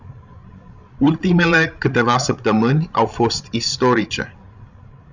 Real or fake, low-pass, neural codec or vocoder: fake; 7.2 kHz; codec, 16 kHz, 16 kbps, FreqCodec, larger model